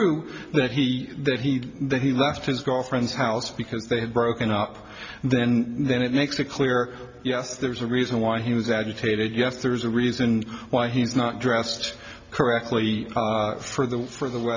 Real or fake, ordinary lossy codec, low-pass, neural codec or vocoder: real; MP3, 64 kbps; 7.2 kHz; none